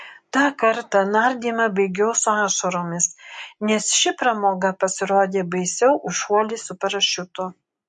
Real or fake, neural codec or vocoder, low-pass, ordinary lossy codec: real; none; 10.8 kHz; MP3, 48 kbps